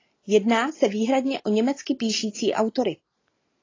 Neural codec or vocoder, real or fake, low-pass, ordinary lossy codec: none; real; 7.2 kHz; AAC, 32 kbps